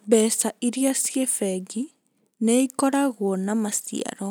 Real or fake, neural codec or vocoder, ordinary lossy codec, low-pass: real; none; none; none